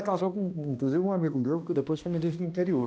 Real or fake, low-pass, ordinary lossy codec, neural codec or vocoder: fake; none; none; codec, 16 kHz, 1 kbps, X-Codec, HuBERT features, trained on balanced general audio